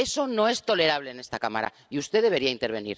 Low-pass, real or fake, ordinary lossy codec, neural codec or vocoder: none; real; none; none